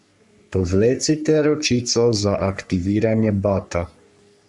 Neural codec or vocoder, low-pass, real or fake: codec, 44.1 kHz, 3.4 kbps, Pupu-Codec; 10.8 kHz; fake